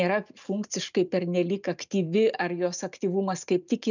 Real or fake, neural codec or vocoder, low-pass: real; none; 7.2 kHz